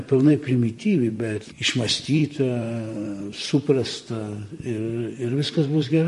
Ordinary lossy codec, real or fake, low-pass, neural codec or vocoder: MP3, 48 kbps; fake; 14.4 kHz; vocoder, 44.1 kHz, 128 mel bands, Pupu-Vocoder